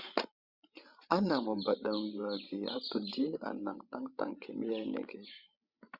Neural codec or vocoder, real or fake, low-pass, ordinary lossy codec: codec, 16 kHz, 16 kbps, FreqCodec, larger model; fake; 5.4 kHz; Opus, 64 kbps